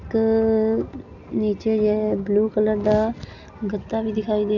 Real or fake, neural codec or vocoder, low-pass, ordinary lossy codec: real; none; 7.2 kHz; none